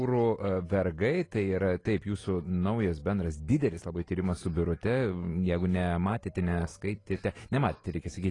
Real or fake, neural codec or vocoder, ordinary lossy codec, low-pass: real; none; AAC, 32 kbps; 10.8 kHz